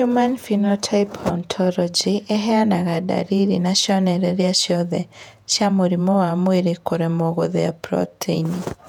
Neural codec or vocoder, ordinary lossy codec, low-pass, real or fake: vocoder, 48 kHz, 128 mel bands, Vocos; none; 19.8 kHz; fake